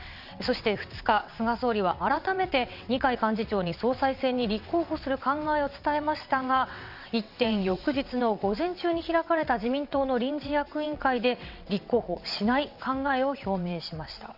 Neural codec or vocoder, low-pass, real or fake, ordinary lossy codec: vocoder, 22.05 kHz, 80 mel bands, WaveNeXt; 5.4 kHz; fake; none